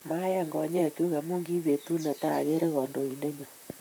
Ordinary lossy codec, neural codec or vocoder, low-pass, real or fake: none; vocoder, 44.1 kHz, 128 mel bands, Pupu-Vocoder; none; fake